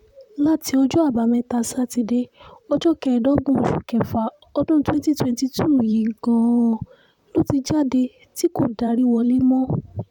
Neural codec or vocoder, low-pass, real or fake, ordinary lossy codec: vocoder, 44.1 kHz, 128 mel bands, Pupu-Vocoder; 19.8 kHz; fake; none